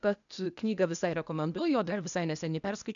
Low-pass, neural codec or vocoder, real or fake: 7.2 kHz; codec, 16 kHz, 0.8 kbps, ZipCodec; fake